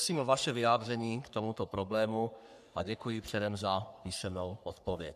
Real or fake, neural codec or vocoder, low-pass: fake; codec, 44.1 kHz, 3.4 kbps, Pupu-Codec; 14.4 kHz